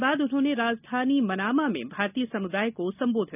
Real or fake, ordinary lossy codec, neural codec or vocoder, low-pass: real; none; none; 3.6 kHz